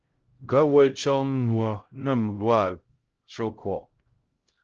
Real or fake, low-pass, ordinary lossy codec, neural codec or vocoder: fake; 7.2 kHz; Opus, 16 kbps; codec, 16 kHz, 0.5 kbps, X-Codec, HuBERT features, trained on LibriSpeech